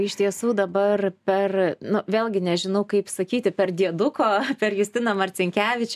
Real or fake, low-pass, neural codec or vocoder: real; 14.4 kHz; none